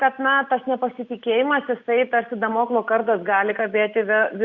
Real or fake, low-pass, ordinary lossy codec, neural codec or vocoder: real; 7.2 kHz; Opus, 64 kbps; none